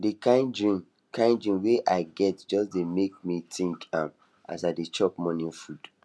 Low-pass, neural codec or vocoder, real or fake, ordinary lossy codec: none; none; real; none